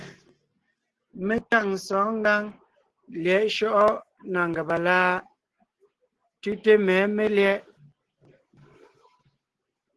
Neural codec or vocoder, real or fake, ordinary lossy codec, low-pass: none; real; Opus, 16 kbps; 10.8 kHz